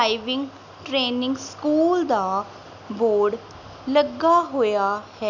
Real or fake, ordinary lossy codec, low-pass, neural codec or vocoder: real; none; 7.2 kHz; none